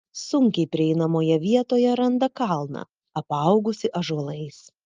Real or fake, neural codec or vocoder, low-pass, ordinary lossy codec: real; none; 7.2 kHz; Opus, 24 kbps